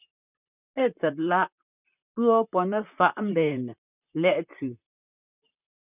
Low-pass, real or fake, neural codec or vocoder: 3.6 kHz; fake; vocoder, 44.1 kHz, 128 mel bands, Pupu-Vocoder